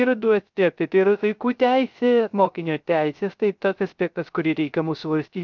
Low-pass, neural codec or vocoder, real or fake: 7.2 kHz; codec, 16 kHz, 0.3 kbps, FocalCodec; fake